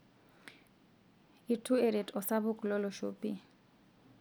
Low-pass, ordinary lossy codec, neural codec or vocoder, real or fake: none; none; none; real